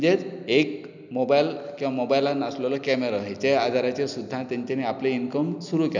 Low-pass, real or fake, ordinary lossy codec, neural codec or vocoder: 7.2 kHz; real; none; none